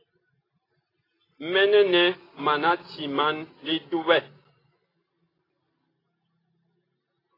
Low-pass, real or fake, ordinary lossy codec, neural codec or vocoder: 5.4 kHz; real; AAC, 24 kbps; none